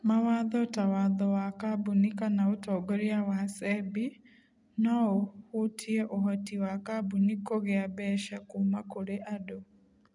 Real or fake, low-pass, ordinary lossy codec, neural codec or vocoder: real; 10.8 kHz; none; none